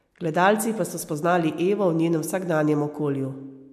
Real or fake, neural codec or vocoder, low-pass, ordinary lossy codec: real; none; 14.4 kHz; MP3, 64 kbps